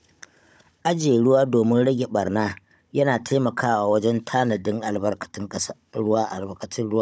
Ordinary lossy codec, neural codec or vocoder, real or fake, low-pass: none; codec, 16 kHz, 16 kbps, FunCodec, trained on Chinese and English, 50 frames a second; fake; none